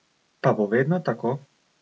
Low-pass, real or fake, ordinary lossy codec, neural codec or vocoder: none; real; none; none